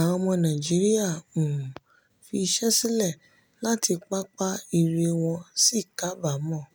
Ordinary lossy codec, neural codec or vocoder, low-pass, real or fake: none; none; none; real